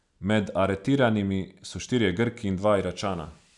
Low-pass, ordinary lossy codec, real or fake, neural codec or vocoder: 10.8 kHz; none; real; none